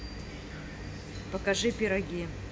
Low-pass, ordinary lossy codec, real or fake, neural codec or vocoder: none; none; real; none